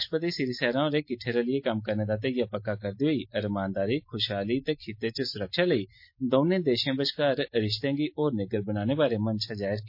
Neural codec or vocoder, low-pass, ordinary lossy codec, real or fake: none; 5.4 kHz; MP3, 32 kbps; real